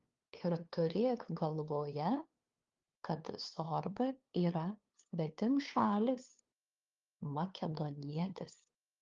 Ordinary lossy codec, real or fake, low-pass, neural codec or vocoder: Opus, 32 kbps; fake; 7.2 kHz; codec, 16 kHz, 2 kbps, FunCodec, trained on LibriTTS, 25 frames a second